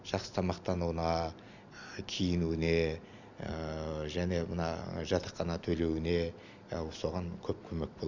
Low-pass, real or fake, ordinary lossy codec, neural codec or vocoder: 7.2 kHz; real; none; none